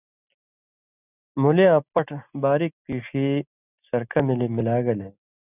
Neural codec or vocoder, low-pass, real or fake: none; 3.6 kHz; real